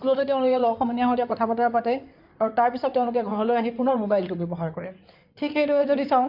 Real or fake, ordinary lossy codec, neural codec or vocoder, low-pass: fake; none; codec, 16 kHz in and 24 kHz out, 2.2 kbps, FireRedTTS-2 codec; 5.4 kHz